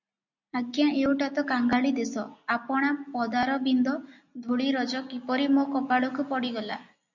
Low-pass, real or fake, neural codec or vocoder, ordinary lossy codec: 7.2 kHz; real; none; MP3, 64 kbps